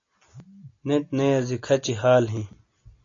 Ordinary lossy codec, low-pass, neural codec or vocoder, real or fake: AAC, 48 kbps; 7.2 kHz; none; real